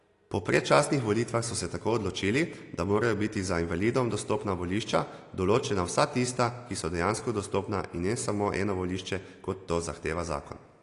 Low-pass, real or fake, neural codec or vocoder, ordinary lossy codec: 10.8 kHz; real; none; AAC, 48 kbps